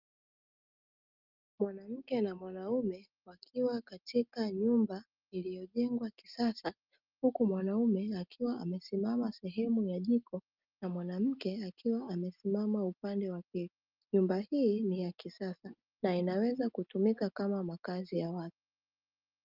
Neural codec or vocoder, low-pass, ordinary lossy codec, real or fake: none; 5.4 kHz; Opus, 32 kbps; real